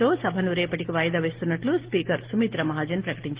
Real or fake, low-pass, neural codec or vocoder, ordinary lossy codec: real; 3.6 kHz; none; Opus, 16 kbps